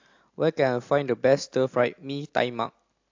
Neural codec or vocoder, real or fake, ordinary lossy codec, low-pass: none; real; AAC, 48 kbps; 7.2 kHz